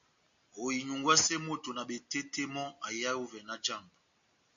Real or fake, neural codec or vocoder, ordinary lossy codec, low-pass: real; none; MP3, 96 kbps; 7.2 kHz